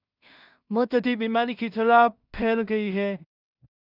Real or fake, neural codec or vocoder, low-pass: fake; codec, 16 kHz in and 24 kHz out, 0.4 kbps, LongCat-Audio-Codec, two codebook decoder; 5.4 kHz